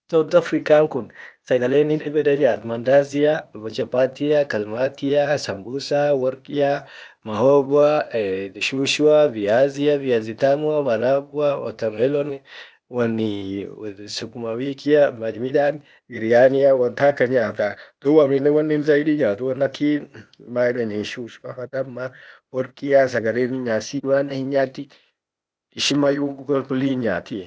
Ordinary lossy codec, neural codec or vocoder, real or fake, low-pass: none; codec, 16 kHz, 0.8 kbps, ZipCodec; fake; none